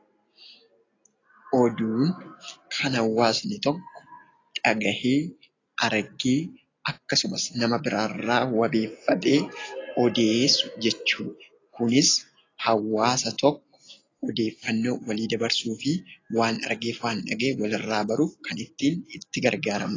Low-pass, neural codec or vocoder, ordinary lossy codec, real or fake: 7.2 kHz; none; AAC, 32 kbps; real